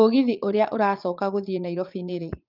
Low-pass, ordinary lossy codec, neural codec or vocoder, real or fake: 5.4 kHz; Opus, 24 kbps; vocoder, 44.1 kHz, 80 mel bands, Vocos; fake